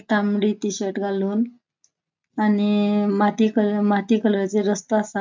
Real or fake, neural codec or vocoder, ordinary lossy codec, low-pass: real; none; MP3, 64 kbps; 7.2 kHz